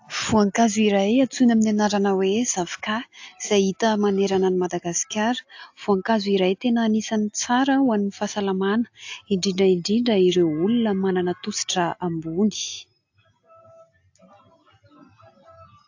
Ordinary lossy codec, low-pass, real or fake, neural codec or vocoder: AAC, 48 kbps; 7.2 kHz; real; none